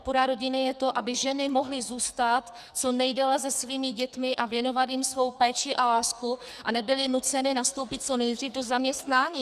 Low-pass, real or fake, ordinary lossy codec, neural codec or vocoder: 14.4 kHz; fake; Opus, 64 kbps; codec, 44.1 kHz, 2.6 kbps, SNAC